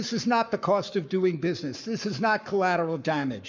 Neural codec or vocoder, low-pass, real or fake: codec, 44.1 kHz, 7.8 kbps, Pupu-Codec; 7.2 kHz; fake